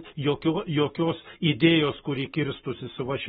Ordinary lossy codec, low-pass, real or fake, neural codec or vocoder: AAC, 16 kbps; 19.8 kHz; fake; codec, 44.1 kHz, 7.8 kbps, Pupu-Codec